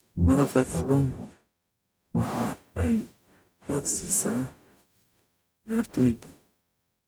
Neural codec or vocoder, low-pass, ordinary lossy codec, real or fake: codec, 44.1 kHz, 0.9 kbps, DAC; none; none; fake